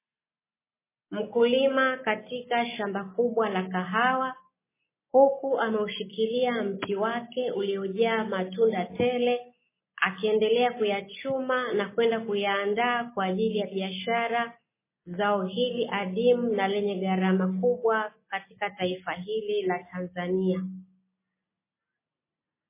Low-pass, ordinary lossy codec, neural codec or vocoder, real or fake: 3.6 kHz; MP3, 16 kbps; none; real